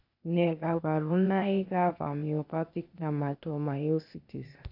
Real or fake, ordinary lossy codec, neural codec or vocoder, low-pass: fake; none; codec, 16 kHz, 0.8 kbps, ZipCodec; 5.4 kHz